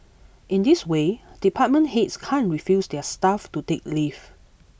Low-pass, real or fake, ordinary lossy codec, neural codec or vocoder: none; real; none; none